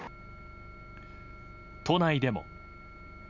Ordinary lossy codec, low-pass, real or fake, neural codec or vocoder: none; 7.2 kHz; real; none